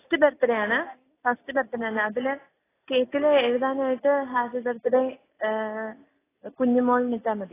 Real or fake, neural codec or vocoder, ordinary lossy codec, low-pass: real; none; AAC, 16 kbps; 3.6 kHz